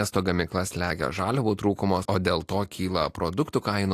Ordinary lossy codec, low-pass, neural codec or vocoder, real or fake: AAC, 64 kbps; 14.4 kHz; none; real